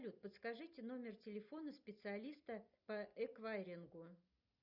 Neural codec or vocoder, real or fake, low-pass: none; real; 5.4 kHz